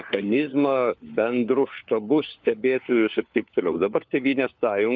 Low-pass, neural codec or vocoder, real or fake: 7.2 kHz; codec, 16 kHz, 4 kbps, FunCodec, trained on LibriTTS, 50 frames a second; fake